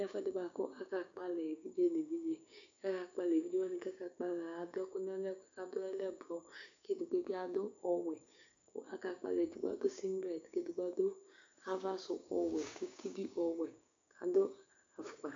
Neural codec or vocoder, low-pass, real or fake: codec, 16 kHz, 6 kbps, DAC; 7.2 kHz; fake